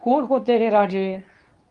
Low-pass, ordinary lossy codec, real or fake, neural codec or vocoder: 10.8 kHz; Opus, 32 kbps; fake; codec, 24 kHz, 0.9 kbps, WavTokenizer, medium speech release version 2